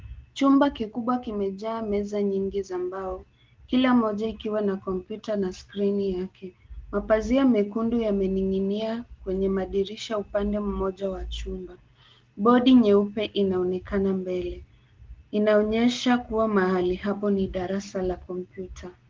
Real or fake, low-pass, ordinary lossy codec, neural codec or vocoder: real; 7.2 kHz; Opus, 16 kbps; none